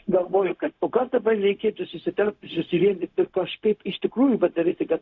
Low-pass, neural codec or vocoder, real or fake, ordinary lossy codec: 7.2 kHz; codec, 16 kHz, 0.4 kbps, LongCat-Audio-Codec; fake; AAC, 48 kbps